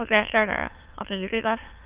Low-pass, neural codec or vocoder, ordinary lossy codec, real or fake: 3.6 kHz; autoencoder, 22.05 kHz, a latent of 192 numbers a frame, VITS, trained on many speakers; Opus, 32 kbps; fake